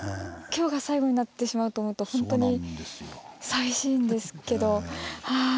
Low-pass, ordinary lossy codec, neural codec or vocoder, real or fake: none; none; none; real